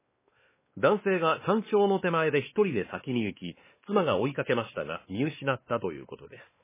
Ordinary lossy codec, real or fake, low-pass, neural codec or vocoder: MP3, 16 kbps; fake; 3.6 kHz; codec, 16 kHz, 2 kbps, X-Codec, WavLM features, trained on Multilingual LibriSpeech